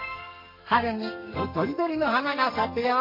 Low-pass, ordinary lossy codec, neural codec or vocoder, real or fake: 5.4 kHz; MP3, 32 kbps; codec, 32 kHz, 1.9 kbps, SNAC; fake